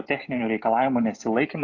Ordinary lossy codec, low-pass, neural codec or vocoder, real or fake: AAC, 48 kbps; 7.2 kHz; none; real